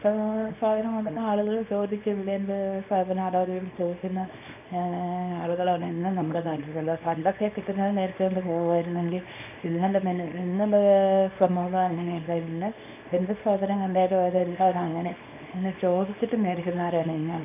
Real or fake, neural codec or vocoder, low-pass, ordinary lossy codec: fake; codec, 24 kHz, 0.9 kbps, WavTokenizer, small release; 3.6 kHz; AAC, 32 kbps